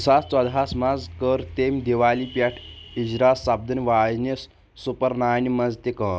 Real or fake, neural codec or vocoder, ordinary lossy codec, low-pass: real; none; none; none